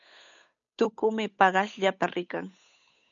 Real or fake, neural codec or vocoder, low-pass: fake; codec, 16 kHz, 8 kbps, FunCodec, trained on Chinese and English, 25 frames a second; 7.2 kHz